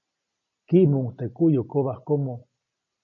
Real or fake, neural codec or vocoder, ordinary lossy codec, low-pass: real; none; MP3, 32 kbps; 7.2 kHz